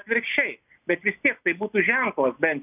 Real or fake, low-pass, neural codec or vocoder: real; 3.6 kHz; none